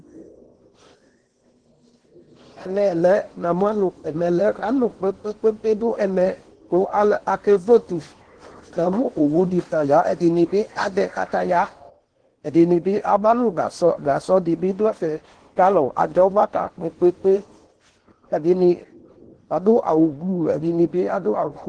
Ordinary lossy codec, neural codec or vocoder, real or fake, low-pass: Opus, 16 kbps; codec, 16 kHz in and 24 kHz out, 0.8 kbps, FocalCodec, streaming, 65536 codes; fake; 9.9 kHz